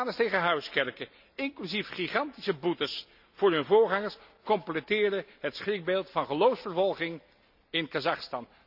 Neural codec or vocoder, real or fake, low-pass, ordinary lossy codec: none; real; 5.4 kHz; none